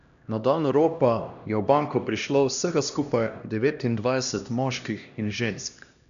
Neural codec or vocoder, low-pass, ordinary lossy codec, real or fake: codec, 16 kHz, 1 kbps, X-Codec, HuBERT features, trained on LibriSpeech; 7.2 kHz; none; fake